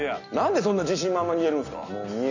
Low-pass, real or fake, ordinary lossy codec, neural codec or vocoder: 7.2 kHz; real; none; none